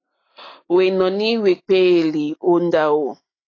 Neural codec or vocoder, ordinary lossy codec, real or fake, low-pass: none; AAC, 32 kbps; real; 7.2 kHz